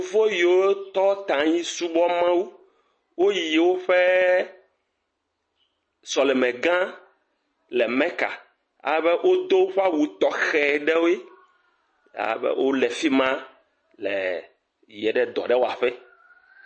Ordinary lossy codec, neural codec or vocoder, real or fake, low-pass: MP3, 32 kbps; none; real; 9.9 kHz